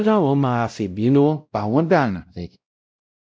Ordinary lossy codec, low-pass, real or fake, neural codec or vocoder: none; none; fake; codec, 16 kHz, 0.5 kbps, X-Codec, WavLM features, trained on Multilingual LibriSpeech